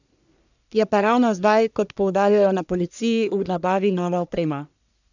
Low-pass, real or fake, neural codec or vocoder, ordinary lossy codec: 7.2 kHz; fake; codec, 44.1 kHz, 1.7 kbps, Pupu-Codec; none